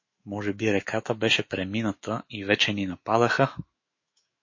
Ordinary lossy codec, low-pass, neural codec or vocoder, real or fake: MP3, 32 kbps; 7.2 kHz; autoencoder, 48 kHz, 128 numbers a frame, DAC-VAE, trained on Japanese speech; fake